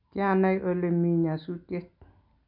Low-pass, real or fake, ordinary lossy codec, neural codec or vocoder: 5.4 kHz; real; none; none